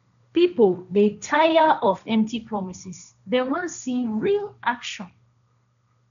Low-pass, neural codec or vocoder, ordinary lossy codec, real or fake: 7.2 kHz; codec, 16 kHz, 1.1 kbps, Voila-Tokenizer; none; fake